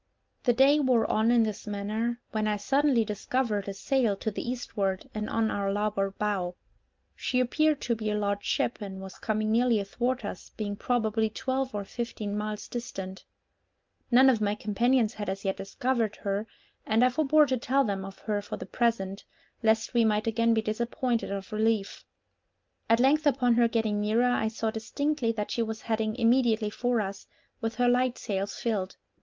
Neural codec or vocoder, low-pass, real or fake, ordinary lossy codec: none; 7.2 kHz; real; Opus, 24 kbps